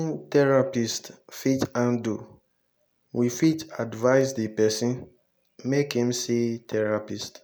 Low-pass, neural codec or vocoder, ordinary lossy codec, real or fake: none; none; none; real